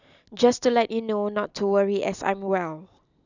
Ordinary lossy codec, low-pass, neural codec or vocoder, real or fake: none; 7.2 kHz; none; real